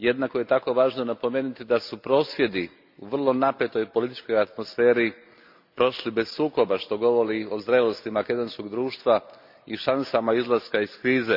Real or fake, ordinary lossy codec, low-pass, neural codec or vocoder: real; MP3, 48 kbps; 5.4 kHz; none